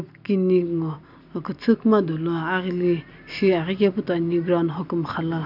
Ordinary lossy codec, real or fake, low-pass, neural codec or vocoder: none; real; 5.4 kHz; none